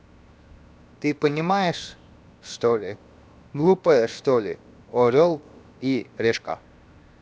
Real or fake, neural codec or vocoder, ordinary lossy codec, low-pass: fake; codec, 16 kHz, 0.7 kbps, FocalCodec; none; none